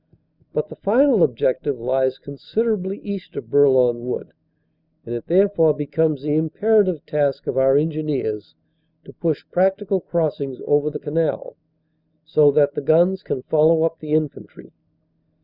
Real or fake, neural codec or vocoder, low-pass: real; none; 5.4 kHz